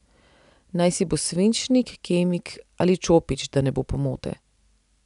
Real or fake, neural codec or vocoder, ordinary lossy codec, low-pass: real; none; none; 10.8 kHz